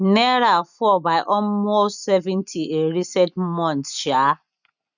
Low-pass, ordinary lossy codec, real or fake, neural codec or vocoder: 7.2 kHz; none; real; none